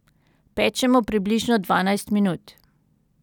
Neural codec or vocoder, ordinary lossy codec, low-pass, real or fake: none; none; 19.8 kHz; real